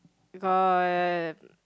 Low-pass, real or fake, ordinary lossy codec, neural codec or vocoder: none; real; none; none